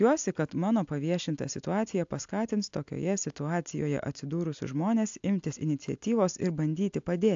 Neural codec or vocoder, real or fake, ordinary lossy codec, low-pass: none; real; AAC, 64 kbps; 7.2 kHz